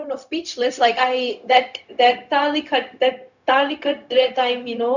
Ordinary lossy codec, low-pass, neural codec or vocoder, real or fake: none; 7.2 kHz; codec, 16 kHz, 0.4 kbps, LongCat-Audio-Codec; fake